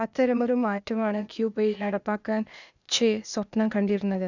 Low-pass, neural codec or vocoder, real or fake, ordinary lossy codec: 7.2 kHz; codec, 16 kHz, 0.8 kbps, ZipCodec; fake; none